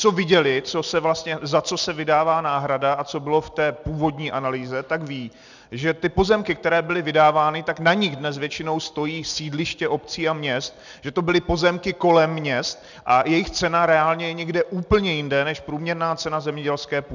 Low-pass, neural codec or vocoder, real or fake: 7.2 kHz; none; real